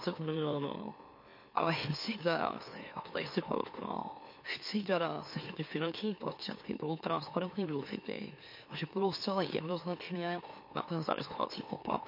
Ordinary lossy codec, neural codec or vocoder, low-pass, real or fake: MP3, 32 kbps; autoencoder, 44.1 kHz, a latent of 192 numbers a frame, MeloTTS; 5.4 kHz; fake